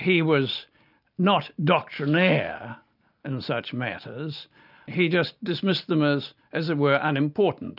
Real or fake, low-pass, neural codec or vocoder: real; 5.4 kHz; none